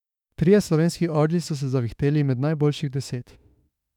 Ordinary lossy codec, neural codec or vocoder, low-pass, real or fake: none; autoencoder, 48 kHz, 32 numbers a frame, DAC-VAE, trained on Japanese speech; 19.8 kHz; fake